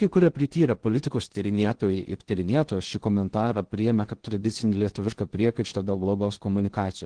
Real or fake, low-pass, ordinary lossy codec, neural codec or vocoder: fake; 9.9 kHz; Opus, 16 kbps; codec, 16 kHz in and 24 kHz out, 0.6 kbps, FocalCodec, streaming, 2048 codes